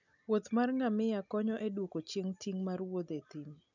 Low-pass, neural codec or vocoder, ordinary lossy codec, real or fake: 7.2 kHz; none; none; real